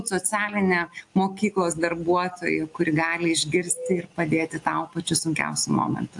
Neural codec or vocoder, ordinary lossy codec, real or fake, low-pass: none; AAC, 64 kbps; real; 10.8 kHz